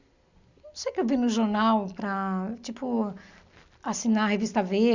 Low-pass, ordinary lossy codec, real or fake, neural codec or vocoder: 7.2 kHz; Opus, 64 kbps; real; none